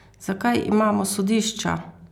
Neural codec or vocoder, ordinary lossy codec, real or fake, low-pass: none; none; real; 19.8 kHz